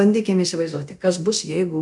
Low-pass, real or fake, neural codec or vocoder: 10.8 kHz; fake; codec, 24 kHz, 0.9 kbps, DualCodec